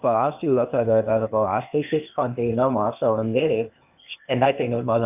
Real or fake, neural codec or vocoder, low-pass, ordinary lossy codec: fake; codec, 16 kHz, 0.8 kbps, ZipCodec; 3.6 kHz; none